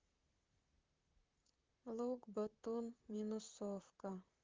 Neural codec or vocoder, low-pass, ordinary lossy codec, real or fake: vocoder, 44.1 kHz, 128 mel bands, Pupu-Vocoder; 7.2 kHz; Opus, 32 kbps; fake